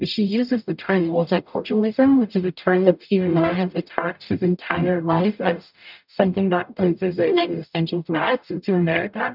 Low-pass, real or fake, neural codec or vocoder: 5.4 kHz; fake; codec, 44.1 kHz, 0.9 kbps, DAC